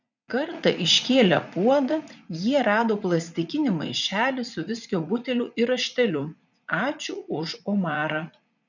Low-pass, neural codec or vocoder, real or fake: 7.2 kHz; vocoder, 44.1 kHz, 80 mel bands, Vocos; fake